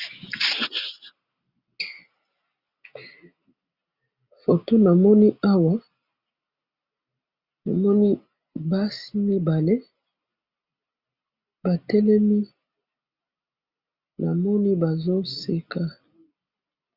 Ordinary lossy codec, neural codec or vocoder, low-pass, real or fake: Opus, 64 kbps; none; 5.4 kHz; real